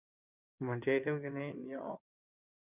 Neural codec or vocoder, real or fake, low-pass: codec, 16 kHz in and 24 kHz out, 2.2 kbps, FireRedTTS-2 codec; fake; 3.6 kHz